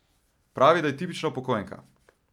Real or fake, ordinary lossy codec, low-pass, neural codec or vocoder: real; none; 19.8 kHz; none